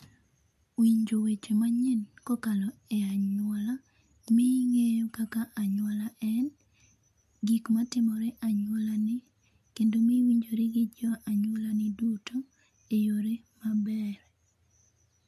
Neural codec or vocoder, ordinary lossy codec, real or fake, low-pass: none; MP3, 64 kbps; real; 14.4 kHz